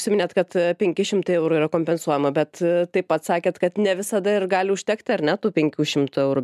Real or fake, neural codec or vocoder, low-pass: real; none; 14.4 kHz